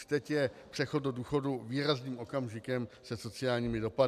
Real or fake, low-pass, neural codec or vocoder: real; 14.4 kHz; none